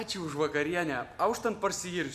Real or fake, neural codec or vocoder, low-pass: real; none; 14.4 kHz